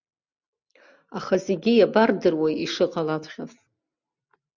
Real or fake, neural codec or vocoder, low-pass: real; none; 7.2 kHz